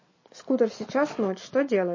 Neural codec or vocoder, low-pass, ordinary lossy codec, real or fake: none; 7.2 kHz; MP3, 32 kbps; real